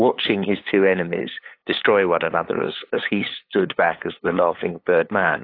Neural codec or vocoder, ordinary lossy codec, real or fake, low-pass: codec, 16 kHz, 8 kbps, FunCodec, trained on LibriTTS, 25 frames a second; AAC, 32 kbps; fake; 5.4 kHz